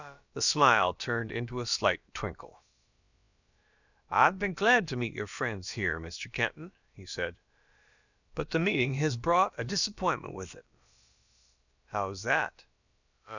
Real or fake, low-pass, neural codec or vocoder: fake; 7.2 kHz; codec, 16 kHz, about 1 kbps, DyCAST, with the encoder's durations